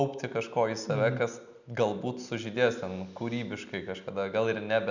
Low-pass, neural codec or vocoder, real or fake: 7.2 kHz; none; real